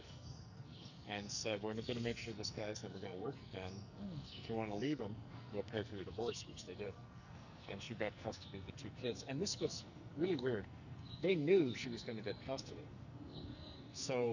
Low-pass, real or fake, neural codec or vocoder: 7.2 kHz; fake; codec, 44.1 kHz, 2.6 kbps, SNAC